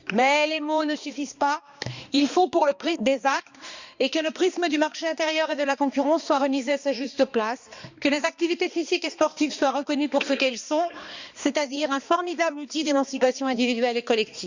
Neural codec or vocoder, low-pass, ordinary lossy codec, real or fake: codec, 16 kHz, 2 kbps, X-Codec, HuBERT features, trained on balanced general audio; 7.2 kHz; Opus, 64 kbps; fake